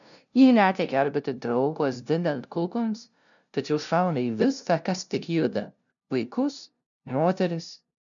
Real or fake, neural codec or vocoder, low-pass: fake; codec, 16 kHz, 0.5 kbps, FunCodec, trained on LibriTTS, 25 frames a second; 7.2 kHz